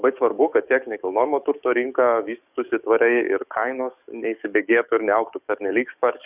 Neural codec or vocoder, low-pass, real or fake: codec, 44.1 kHz, 7.8 kbps, DAC; 3.6 kHz; fake